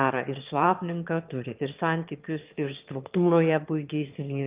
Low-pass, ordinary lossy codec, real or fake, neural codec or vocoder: 3.6 kHz; Opus, 32 kbps; fake; autoencoder, 22.05 kHz, a latent of 192 numbers a frame, VITS, trained on one speaker